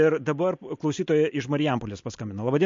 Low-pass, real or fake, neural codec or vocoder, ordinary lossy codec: 7.2 kHz; real; none; MP3, 48 kbps